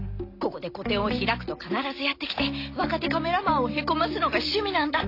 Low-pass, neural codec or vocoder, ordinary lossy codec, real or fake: 5.4 kHz; none; AAC, 32 kbps; real